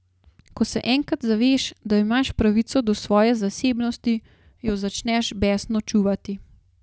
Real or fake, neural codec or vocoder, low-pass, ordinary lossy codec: real; none; none; none